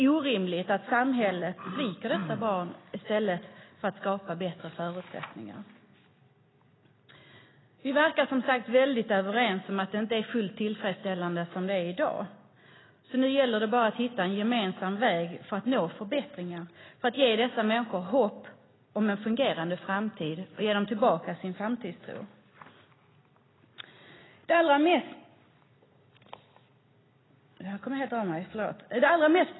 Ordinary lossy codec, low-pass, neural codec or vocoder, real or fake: AAC, 16 kbps; 7.2 kHz; none; real